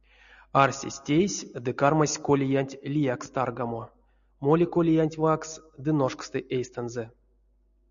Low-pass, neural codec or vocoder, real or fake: 7.2 kHz; none; real